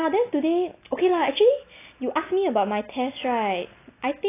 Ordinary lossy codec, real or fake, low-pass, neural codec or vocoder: AAC, 24 kbps; real; 3.6 kHz; none